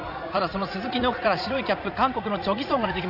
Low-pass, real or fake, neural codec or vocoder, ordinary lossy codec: 5.4 kHz; fake; vocoder, 44.1 kHz, 80 mel bands, Vocos; none